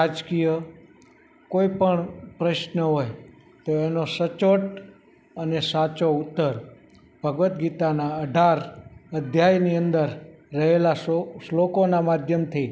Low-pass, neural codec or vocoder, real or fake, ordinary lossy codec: none; none; real; none